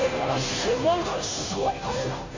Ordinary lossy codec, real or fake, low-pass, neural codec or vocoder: AAC, 32 kbps; fake; 7.2 kHz; codec, 16 kHz, 0.5 kbps, FunCodec, trained on Chinese and English, 25 frames a second